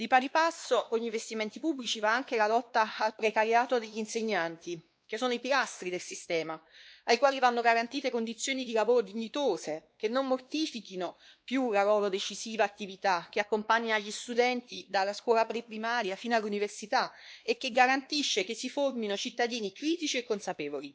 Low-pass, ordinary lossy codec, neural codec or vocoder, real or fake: none; none; codec, 16 kHz, 2 kbps, X-Codec, WavLM features, trained on Multilingual LibriSpeech; fake